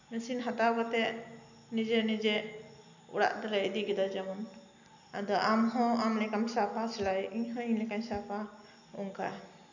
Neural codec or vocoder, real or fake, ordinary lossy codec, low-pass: none; real; none; 7.2 kHz